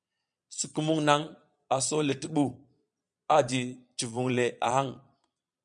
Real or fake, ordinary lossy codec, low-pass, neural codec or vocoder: fake; MP3, 96 kbps; 9.9 kHz; vocoder, 22.05 kHz, 80 mel bands, Vocos